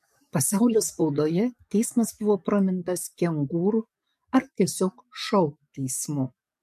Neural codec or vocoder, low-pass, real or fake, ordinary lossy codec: codec, 44.1 kHz, 7.8 kbps, DAC; 14.4 kHz; fake; MP3, 64 kbps